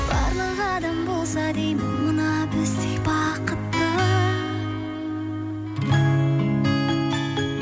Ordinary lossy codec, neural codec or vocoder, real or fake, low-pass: none; none; real; none